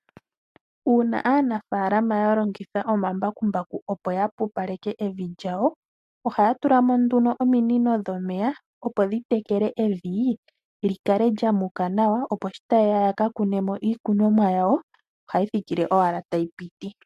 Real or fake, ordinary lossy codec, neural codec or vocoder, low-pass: real; MP3, 64 kbps; none; 14.4 kHz